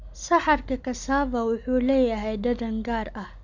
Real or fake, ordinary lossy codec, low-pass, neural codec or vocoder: real; none; 7.2 kHz; none